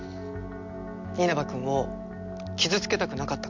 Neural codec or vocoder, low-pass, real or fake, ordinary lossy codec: none; 7.2 kHz; real; MP3, 64 kbps